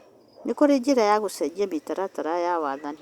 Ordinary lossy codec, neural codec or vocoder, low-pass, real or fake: Opus, 64 kbps; none; 19.8 kHz; real